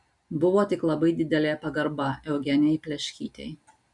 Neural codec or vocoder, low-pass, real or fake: none; 10.8 kHz; real